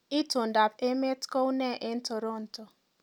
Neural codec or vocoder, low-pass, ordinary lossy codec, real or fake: none; 19.8 kHz; none; real